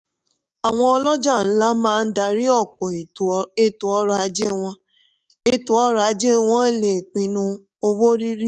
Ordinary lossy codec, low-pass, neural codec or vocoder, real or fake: none; 10.8 kHz; codec, 44.1 kHz, 7.8 kbps, DAC; fake